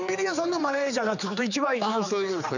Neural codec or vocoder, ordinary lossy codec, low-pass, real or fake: codec, 16 kHz, 4 kbps, X-Codec, HuBERT features, trained on general audio; none; 7.2 kHz; fake